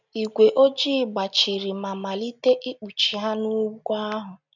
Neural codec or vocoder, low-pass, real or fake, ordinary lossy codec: none; 7.2 kHz; real; none